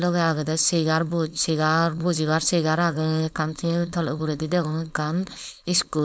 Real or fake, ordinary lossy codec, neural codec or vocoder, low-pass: fake; none; codec, 16 kHz, 4.8 kbps, FACodec; none